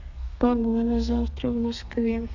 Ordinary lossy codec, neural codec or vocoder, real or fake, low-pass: none; codec, 32 kHz, 1.9 kbps, SNAC; fake; 7.2 kHz